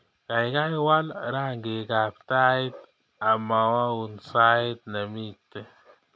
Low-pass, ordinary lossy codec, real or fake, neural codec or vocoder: none; none; real; none